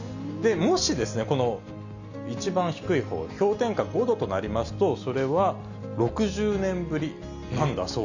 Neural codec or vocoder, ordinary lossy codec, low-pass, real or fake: none; none; 7.2 kHz; real